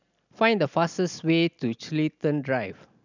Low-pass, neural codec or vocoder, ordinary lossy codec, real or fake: 7.2 kHz; none; none; real